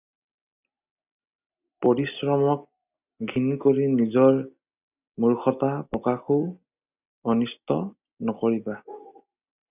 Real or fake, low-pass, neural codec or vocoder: real; 3.6 kHz; none